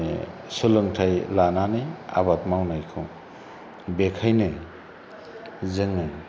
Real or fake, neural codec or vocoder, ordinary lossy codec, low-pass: real; none; none; none